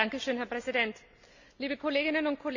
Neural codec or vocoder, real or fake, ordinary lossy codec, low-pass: none; real; none; 7.2 kHz